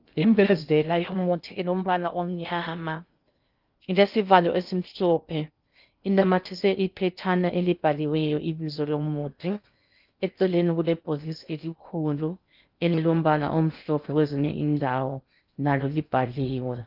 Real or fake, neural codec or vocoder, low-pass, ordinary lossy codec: fake; codec, 16 kHz in and 24 kHz out, 0.6 kbps, FocalCodec, streaming, 2048 codes; 5.4 kHz; Opus, 24 kbps